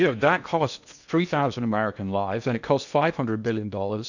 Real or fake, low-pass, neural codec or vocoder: fake; 7.2 kHz; codec, 16 kHz in and 24 kHz out, 0.8 kbps, FocalCodec, streaming, 65536 codes